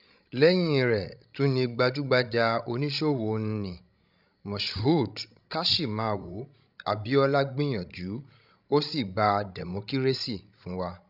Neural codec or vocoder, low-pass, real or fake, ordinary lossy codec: codec, 16 kHz, 16 kbps, FreqCodec, larger model; 5.4 kHz; fake; none